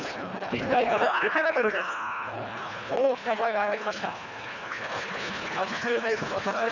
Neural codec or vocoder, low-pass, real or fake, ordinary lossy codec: codec, 24 kHz, 1.5 kbps, HILCodec; 7.2 kHz; fake; none